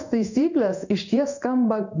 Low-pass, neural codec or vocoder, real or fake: 7.2 kHz; codec, 16 kHz in and 24 kHz out, 1 kbps, XY-Tokenizer; fake